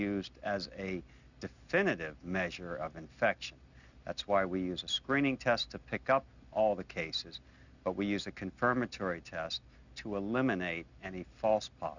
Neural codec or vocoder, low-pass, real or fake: none; 7.2 kHz; real